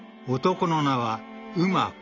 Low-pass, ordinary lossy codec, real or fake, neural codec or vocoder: 7.2 kHz; AAC, 32 kbps; real; none